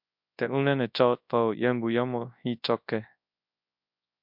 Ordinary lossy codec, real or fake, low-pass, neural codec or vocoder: MP3, 48 kbps; fake; 5.4 kHz; codec, 24 kHz, 0.9 kbps, WavTokenizer, large speech release